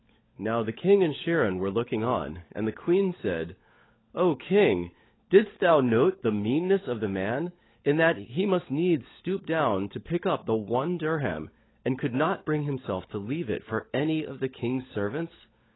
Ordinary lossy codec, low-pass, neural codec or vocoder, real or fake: AAC, 16 kbps; 7.2 kHz; codec, 16 kHz, 16 kbps, FunCodec, trained on LibriTTS, 50 frames a second; fake